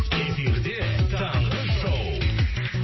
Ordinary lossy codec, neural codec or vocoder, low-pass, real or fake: MP3, 24 kbps; none; 7.2 kHz; real